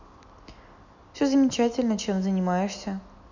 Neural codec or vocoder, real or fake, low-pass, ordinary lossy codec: none; real; 7.2 kHz; none